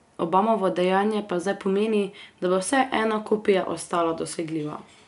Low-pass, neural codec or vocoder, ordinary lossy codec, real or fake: 10.8 kHz; none; none; real